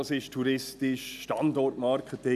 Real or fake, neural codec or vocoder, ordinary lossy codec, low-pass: real; none; none; 14.4 kHz